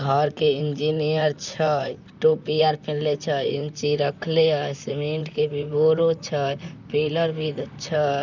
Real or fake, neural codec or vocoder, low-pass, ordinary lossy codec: fake; vocoder, 44.1 kHz, 128 mel bands, Pupu-Vocoder; 7.2 kHz; none